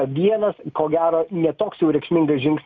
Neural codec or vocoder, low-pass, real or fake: none; 7.2 kHz; real